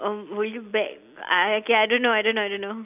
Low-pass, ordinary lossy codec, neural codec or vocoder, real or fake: 3.6 kHz; AAC, 32 kbps; none; real